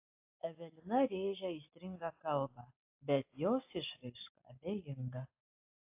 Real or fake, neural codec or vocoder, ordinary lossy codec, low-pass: real; none; AAC, 32 kbps; 3.6 kHz